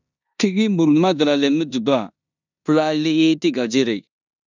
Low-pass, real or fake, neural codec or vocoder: 7.2 kHz; fake; codec, 16 kHz in and 24 kHz out, 0.9 kbps, LongCat-Audio-Codec, four codebook decoder